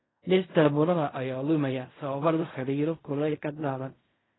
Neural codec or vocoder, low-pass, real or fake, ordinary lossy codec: codec, 16 kHz in and 24 kHz out, 0.4 kbps, LongCat-Audio-Codec, fine tuned four codebook decoder; 7.2 kHz; fake; AAC, 16 kbps